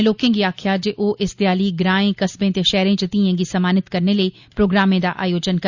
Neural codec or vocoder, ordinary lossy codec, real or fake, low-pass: none; Opus, 64 kbps; real; 7.2 kHz